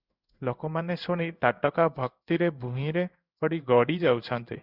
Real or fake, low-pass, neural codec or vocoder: fake; 5.4 kHz; codec, 16 kHz in and 24 kHz out, 1 kbps, XY-Tokenizer